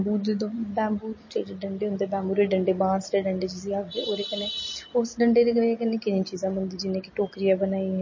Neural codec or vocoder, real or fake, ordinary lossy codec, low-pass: none; real; MP3, 32 kbps; 7.2 kHz